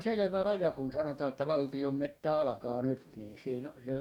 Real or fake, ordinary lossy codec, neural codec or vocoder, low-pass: fake; none; codec, 44.1 kHz, 2.6 kbps, DAC; 19.8 kHz